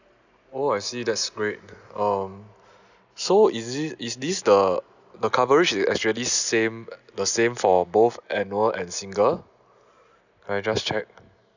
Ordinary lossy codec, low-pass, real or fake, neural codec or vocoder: none; 7.2 kHz; real; none